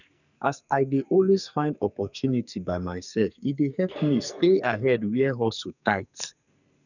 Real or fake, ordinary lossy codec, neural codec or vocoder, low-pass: fake; none; codec, 44.1 kHz, 2.6 kbps, SNAC; 7.2 kHz